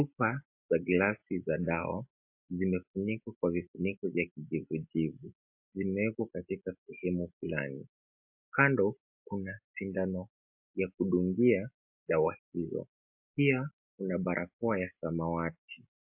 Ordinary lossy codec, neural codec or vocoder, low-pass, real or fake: MP3, 32 kbps; none; 3.6 kHz; real